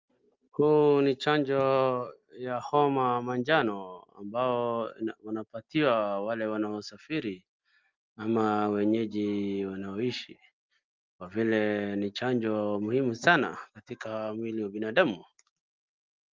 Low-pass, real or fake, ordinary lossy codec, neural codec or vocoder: 7.2 kHz; real; Opus, 32 kbps; none